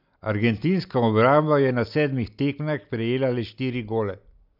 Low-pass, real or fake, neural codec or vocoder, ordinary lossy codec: 5.4 kHz; real; none; none